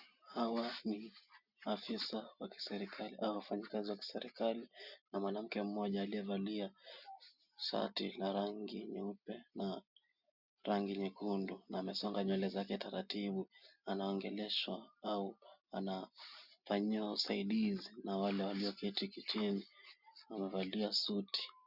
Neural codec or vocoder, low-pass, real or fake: none; 5.4 kHz; real